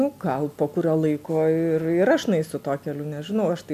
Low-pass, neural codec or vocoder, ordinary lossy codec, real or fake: 14.4 kHz; none; MP3, 96 kbps; real